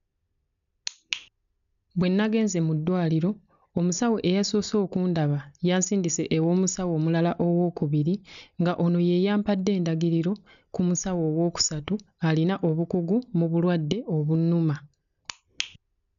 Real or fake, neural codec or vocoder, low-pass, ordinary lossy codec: real; none; 7.2 kHz; none